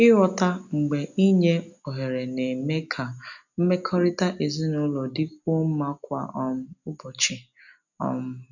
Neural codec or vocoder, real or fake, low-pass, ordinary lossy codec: none; real; 7.2 kHz; none